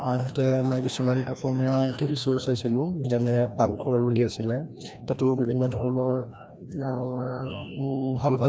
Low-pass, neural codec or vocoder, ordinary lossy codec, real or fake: none; codec, 16 kHz, 1 kbps, FreqCodec, larger model; none; fake